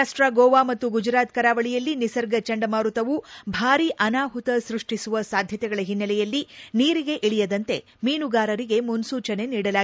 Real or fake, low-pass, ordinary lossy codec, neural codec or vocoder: real; none; none; none